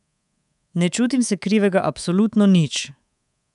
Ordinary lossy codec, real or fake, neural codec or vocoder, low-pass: none; fake; codec, 24 kHz, 3.1 kbps, DualCodec; 10.8 kHz